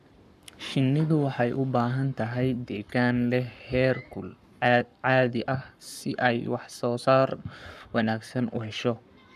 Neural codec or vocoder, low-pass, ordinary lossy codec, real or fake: codec, 44.1 kHz, 7.8 kbps, Pupu-Codec; 14.4 kHz; none; fake